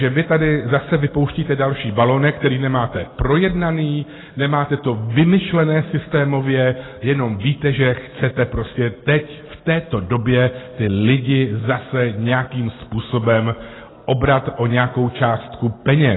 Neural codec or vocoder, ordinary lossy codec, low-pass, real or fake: none; AAC, 16 kbps; 7.2 kHz; real